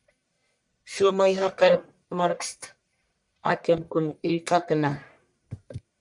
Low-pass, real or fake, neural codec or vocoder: 10.8 kHz; fake; codec, 44.1 kHz, 1.7 kbps, Pupu-Codec